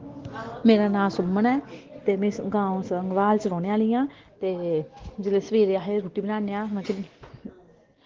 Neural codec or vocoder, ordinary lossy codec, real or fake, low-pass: none; Opus, 16 kbps; real; 7.2 kHz